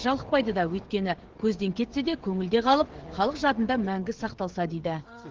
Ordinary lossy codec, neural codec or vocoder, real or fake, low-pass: Opus, 16 kbps; codec, 16 kHz, 16 kbps, FreqCodec, smaller model; fake; 7.2 kHz